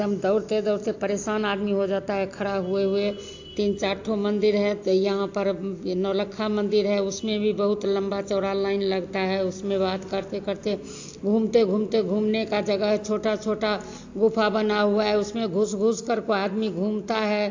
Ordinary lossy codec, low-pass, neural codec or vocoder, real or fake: AAC, 48 kbps; 7.2 kHz; none; real